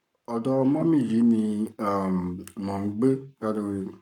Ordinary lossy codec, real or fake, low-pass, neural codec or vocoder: none; fake; 19.8 kHz; codec, 44.1 kHz, 7.8 kbps, Pupu-Codec